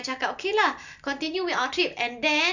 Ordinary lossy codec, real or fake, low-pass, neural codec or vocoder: none; real; 7.2 kHz; none